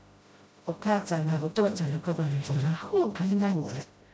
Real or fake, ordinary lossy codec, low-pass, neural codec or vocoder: fake; none; none; codec, 16 kHz, 0.5 kbps, FreqCodec, smaller model